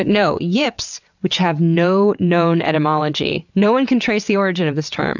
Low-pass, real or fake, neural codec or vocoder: 7.2 kHz; fake; vocoder, 22.05 kHz, 80 mel bands, WaveNeXt